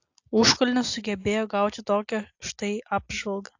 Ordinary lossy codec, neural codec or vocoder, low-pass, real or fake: AAC, 48 kbps; none; 7.2 kHz; real